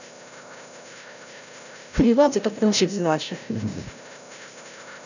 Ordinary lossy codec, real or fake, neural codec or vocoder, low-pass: none; fake; codec, 16 kHz, 0.5 kbps, FreqCodec, larger model; 7.2 kHz